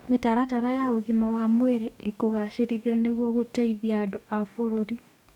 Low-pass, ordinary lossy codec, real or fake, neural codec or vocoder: 19.8 kHz; none; fake; codec, 44.1 kHz, 2.6 kbps, DAC